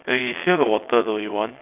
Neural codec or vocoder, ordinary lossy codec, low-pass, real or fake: vocoder, 22.05 kHz, 80 mel bands, WaveNeXt; none; 3.6 kHz; fake